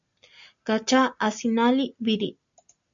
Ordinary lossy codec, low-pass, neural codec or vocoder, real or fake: MP3, 64 kbps; 7.2 kHz; none; real